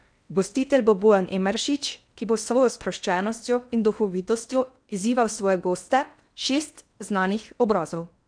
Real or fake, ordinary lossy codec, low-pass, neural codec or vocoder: fake; none; 9.9 kHz; codec, 16 kHz in and 24 kHz out, 0.8 kbps, FocalCodec, streaming, 65536 codes